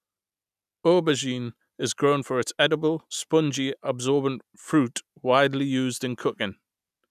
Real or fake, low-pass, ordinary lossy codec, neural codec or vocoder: real; 14.4 kHz; none; none